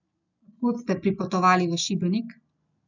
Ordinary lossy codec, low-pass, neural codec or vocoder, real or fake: none; 7.2 kHz; none; real